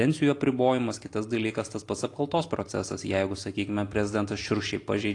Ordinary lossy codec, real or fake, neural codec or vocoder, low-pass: AAC, 48 kbps; real; none; 10.8 kHz